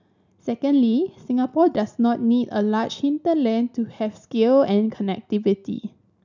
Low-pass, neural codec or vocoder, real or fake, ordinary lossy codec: 7.2 kHz; none; real; none